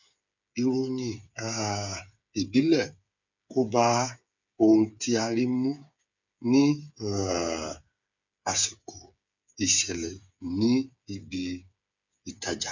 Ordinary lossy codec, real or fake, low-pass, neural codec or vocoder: none; fake; 7.2 kHz; codec, 16 kHz, 8 kbps, FreqCodec, smaller model